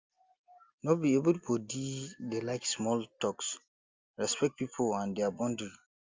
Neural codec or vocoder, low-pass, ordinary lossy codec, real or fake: none; 7.2 kHz; Opus, 32 kbps; real